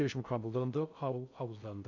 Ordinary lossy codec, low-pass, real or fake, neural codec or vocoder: none; 7.2 kHz; fake; codec, 16 kHz in and 24 kHz out, 0.6 kbps, FocalCodec, streaming, 2048 codes